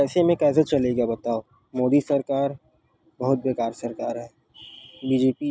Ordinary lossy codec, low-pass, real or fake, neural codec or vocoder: none; none; real; none